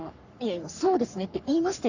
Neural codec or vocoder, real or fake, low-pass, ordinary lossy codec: codec, 44.1 kHz, 3.4 kbps, Pupu-Codec; fake; 7.2 kHz; none